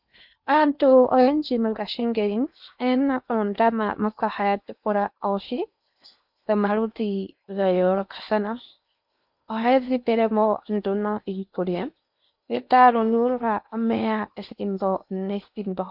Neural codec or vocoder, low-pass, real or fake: codec, 16 kHz in and 24 kHz out, 0.8 kbps, FocalCodec, streaming, 65536 codes; 5.4 kHz; fake